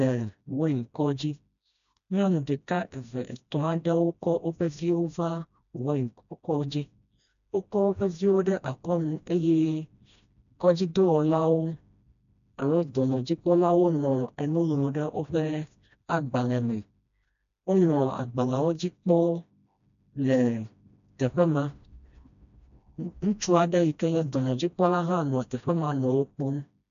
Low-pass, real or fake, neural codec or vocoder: 7.2 kHz; fake; codec, 16 kHz, 1 kbps, FreqCodec, smaller model